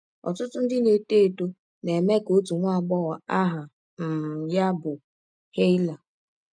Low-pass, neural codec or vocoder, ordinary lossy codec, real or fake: 9.9 kHz; none; none; real